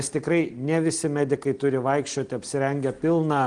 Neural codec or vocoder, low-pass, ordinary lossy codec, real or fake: none; 9.9 kHz; Opus, 24 kbps; real